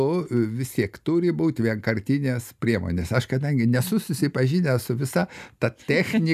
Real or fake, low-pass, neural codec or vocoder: real; 14.4 kHz; none